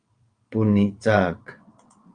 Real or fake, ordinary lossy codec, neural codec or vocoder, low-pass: fake; Opus, 32 kbps; vocoder, 22.05 kHz, 80 mel bands, WaveNeXt; 9.9 kHz